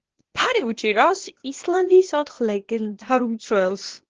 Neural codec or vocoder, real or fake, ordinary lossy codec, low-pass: codec, 16 kHz, 0.8 kbps, ZipCodec; fake; Opus, 16 kbps; 7.2 kHz